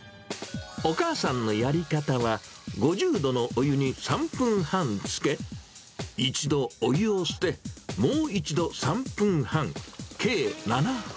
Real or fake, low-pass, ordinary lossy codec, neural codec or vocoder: real; none; none; none